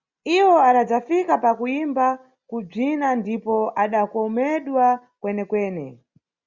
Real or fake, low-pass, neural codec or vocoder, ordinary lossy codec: real; 7.2 kHz; none; Opus, 64 kbps